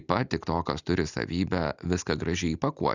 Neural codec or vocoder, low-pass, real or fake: none; 7.2 kHz; real